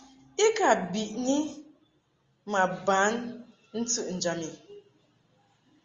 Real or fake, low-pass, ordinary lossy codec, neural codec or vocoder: real; 7.2 kHz; Opus, 32 kbps; none